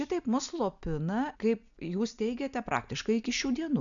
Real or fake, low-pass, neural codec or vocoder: real; 7.2 kHz; none